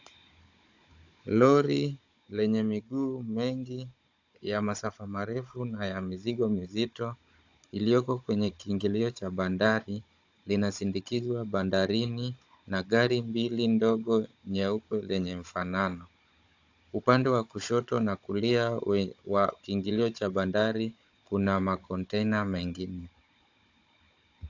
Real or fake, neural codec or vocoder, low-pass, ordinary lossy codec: fake; codec, 16 kHz, 16 kbps, FunCodec, trained on Chinese and English, 50 frames a second; 7.2 kHz; AAC, 48 kbps